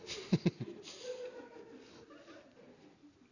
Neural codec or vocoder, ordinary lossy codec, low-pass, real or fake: none; none; 7.2 kHz; real